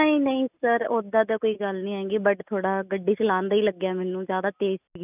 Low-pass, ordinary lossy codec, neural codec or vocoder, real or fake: 3.6 kHz; none; none; real